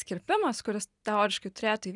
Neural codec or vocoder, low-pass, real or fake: none; 10.8 kHz; real